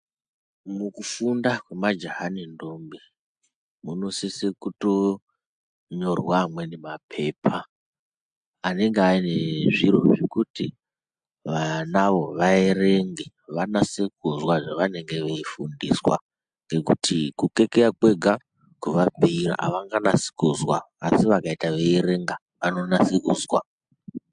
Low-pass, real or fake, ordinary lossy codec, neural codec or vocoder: 9.9 kHz; real; MP3, 64 kbps; none